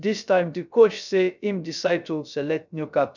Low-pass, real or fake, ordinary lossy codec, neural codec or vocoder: 7.2 kHz; fake; none; codec, 16 kHz, 0.3 kbps, FocalCodec